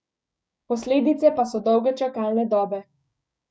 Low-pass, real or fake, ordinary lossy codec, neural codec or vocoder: none; fake; none; codec, 16 kHz, 6 kbps, DAC